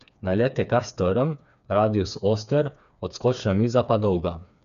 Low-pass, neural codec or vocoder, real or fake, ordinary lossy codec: 7.2 kHz; codec, 16 kHz, 4 kbps, FreqCodec, smaller model; fake; none